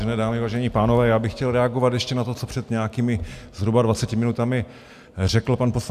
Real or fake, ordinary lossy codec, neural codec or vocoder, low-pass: real; AAC, 96 kbps; none; 14.4 kHz